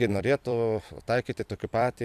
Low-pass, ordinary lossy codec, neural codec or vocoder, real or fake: 14.4 kHz; AAC, 96 kbps; vocoder, 44.1 kHz, 128 mel bands every 256 samples, BigVGAN v2; fake